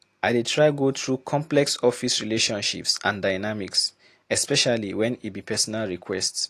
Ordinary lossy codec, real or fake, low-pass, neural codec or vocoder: AAC, 48 kbps; real; 14.4 kHz; none